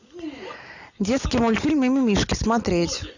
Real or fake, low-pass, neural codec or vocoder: real; 7.2 kHz; none